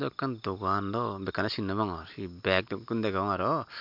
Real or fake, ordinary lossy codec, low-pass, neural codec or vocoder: real; MP3, 48 kbps; 5.4 kHz; none